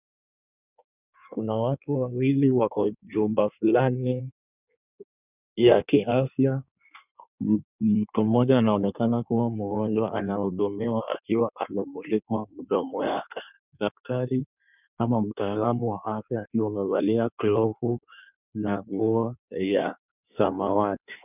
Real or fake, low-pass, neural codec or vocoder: fake; 3.6 kHz; codec, 16 kHz in and 24 kHz out, 1.1 kbps, FireRedTTS-2 codec